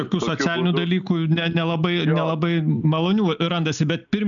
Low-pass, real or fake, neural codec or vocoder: 7.2 kHz; real; none